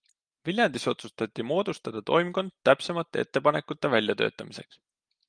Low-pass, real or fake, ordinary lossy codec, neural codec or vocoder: 9.9 kHz; real; Opus, 32 kbps; none